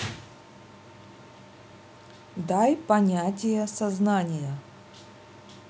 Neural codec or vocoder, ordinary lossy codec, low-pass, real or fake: none; none; none; real